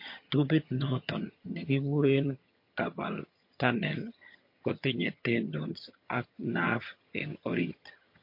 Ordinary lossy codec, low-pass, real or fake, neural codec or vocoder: MP3, 32 kbps; 5.4 kHz; fake; vocoder, 22.05 kHz, 80 mel bands, HiFi-GAN